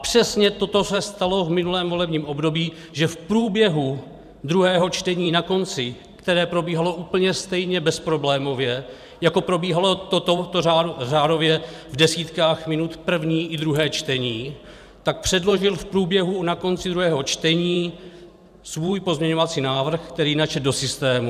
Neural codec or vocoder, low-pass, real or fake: vocoder, 44.1 kHz, 128 mel bands every 512 samples, BigVGAN v2; 14.4 kHz; fake